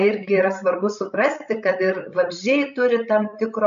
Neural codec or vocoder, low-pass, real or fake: codec, 16 kHz, 16 kbps, FreqCodec, larger model; 7.2 kHz; fake